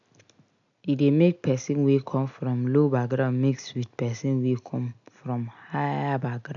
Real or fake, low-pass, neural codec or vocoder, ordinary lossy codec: real; 7.2 kHz; none; none